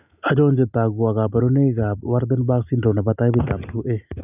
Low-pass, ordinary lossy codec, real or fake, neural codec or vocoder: 3.6 kHz; none; real; none